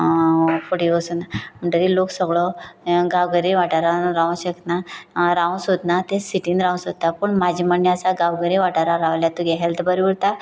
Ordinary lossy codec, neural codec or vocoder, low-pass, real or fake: none; none; none; real